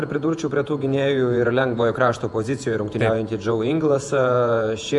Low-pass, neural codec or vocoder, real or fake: 10.8 kHz; vocoder, 48 kHz, 128 mel bands, Vocos; fake